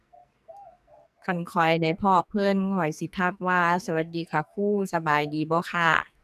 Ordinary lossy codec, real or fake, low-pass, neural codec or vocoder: none; fake; 14.4 kHz; codec, 44.1 kHz, 2.6 kbps, SNAC